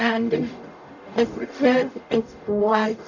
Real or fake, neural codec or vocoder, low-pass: fake; codec, 44.1 kHz, 0.9 kbps, DAC; 7.2 kHz